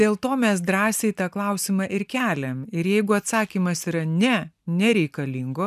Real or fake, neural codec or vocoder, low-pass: real; none; 14.4 kHz